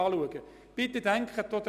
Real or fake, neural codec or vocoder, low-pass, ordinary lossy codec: real; none; 14.4 kHz; none